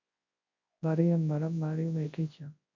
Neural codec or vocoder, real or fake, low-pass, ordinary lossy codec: codec, 24 kHz, 0.9 kbps, WavTokenizer, large speech release; fake; 7.2 kHz; MP3, 48 kbps